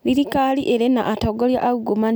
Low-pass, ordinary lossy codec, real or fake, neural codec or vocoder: none; none; real; none